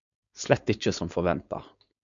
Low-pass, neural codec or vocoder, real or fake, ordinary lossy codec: 7.2 kHz; codec, 16 kHz, 4.8 kbps, FACodec; fake; MP3, 64 kbps